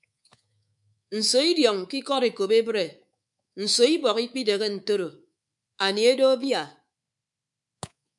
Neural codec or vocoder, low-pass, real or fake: codec, 24 kHz, 3.1 kbps, DualCodec; 10.8 kHz; fake